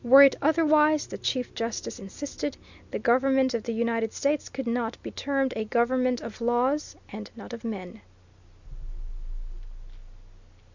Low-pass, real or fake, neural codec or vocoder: 7.2 kHz; real; none